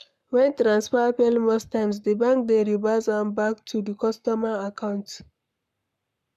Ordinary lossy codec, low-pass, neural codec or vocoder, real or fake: none; 14.4 kHz; codec, 44.1 kHz, 7.8 kbps, Pupu-Codec; fake